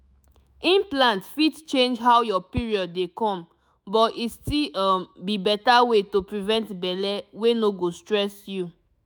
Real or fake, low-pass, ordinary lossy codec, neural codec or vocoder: fake; none; none; autoencoder, 48 kHz, 128 numbers a frame, DAC-VAE, trained on Japanese speech